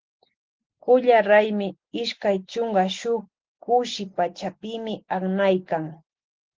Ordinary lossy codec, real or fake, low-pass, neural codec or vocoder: Opus, 16 kbps; real; 7.2 kHz; none